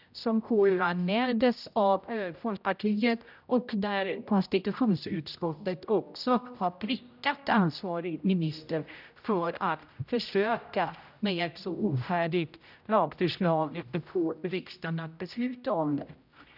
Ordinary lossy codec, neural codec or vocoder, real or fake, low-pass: none; codec, 16 kHz, 0.5 kbps, X-Codec, HuBERT features, trained on general audio; fake; 5.4 kHz